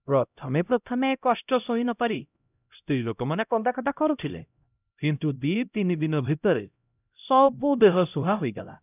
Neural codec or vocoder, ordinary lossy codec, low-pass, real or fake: codec, 16 kHz, 0.5 kbps, X-Codec, HuBERT features, trained on LibriSpeech; none; 3.6 kHz; fake